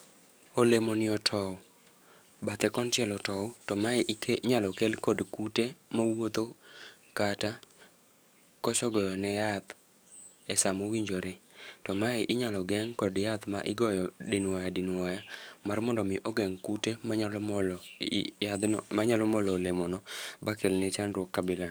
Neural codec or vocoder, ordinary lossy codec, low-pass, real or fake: codec, 44.1 kHz, 7.8 kbps, DAC; none; none; fake